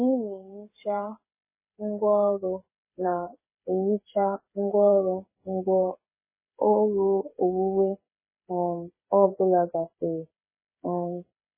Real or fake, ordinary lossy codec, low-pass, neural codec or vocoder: fake; MP3, 16 kbps; 3.6 kHz; codec, 16 kHz, 8 kbps, FreqCodec, smaller model